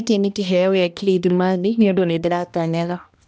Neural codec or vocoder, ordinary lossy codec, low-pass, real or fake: codec, 16 kHz, 1 kbps, X-Codec, HuBERT features, trained on balanced general audio; none; none; fake